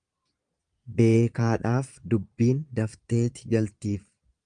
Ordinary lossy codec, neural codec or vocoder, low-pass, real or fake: Opus, 32 kbps; vocoder, 22.05 kHz, 80 mel bands, Vocos; 9.9 kHz; fake